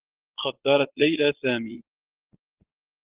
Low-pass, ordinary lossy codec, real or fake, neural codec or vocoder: 3.6 kHz; Opus, 16 kbps; fake; vocoder, 22.05 kHz, 80 mel bands, Vocos